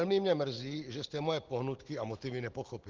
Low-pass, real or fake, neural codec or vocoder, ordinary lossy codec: 7.2 kHz; real; none; Opus, 16 kbps